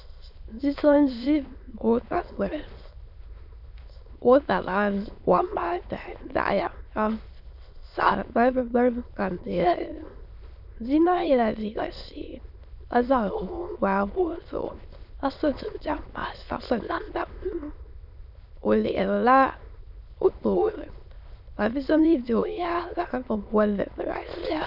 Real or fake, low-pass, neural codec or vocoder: fake; 5.4 kHz; autoencoder, 22.05 kHz, a latent of 192 numbers a frame, VITS, trained on many speakers